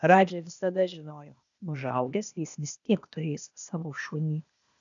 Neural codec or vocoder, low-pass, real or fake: codec, 16 kHz, 0.8 kbps, ZipCodec; 7.2 kHz; fake